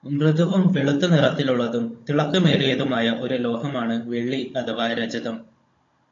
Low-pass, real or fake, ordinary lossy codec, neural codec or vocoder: 7.2 kHz; fake; AAC, 32 kbps; codec, 16 kHz, 16 kbps, FunCodec, trained on Chinese and English, 50 frames a second